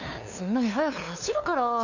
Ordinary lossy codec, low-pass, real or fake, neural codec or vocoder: none; 7.2 kHz; fake; codec, 16 kHz, 2 kbps, FunCodec, trained on LibriTTS, 25 frames a second